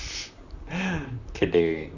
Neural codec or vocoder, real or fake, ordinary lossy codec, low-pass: vocoder, 44.1 kHz, 128 mel bands, Pupu-Vocoder; fake; none; 7.2 kHz